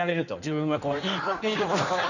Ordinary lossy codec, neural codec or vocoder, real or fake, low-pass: none; codec, 16 kHz in and 24 kHz out, 1.1 kbps, FireRedTTS-2 codec; fake; 7.2 kHz